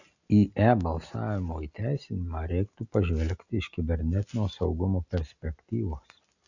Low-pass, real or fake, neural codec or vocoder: 7.2 kHz; real; none